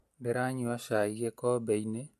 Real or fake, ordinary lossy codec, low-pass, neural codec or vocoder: real; MP3, 64 kbps; 14.4 kHz; none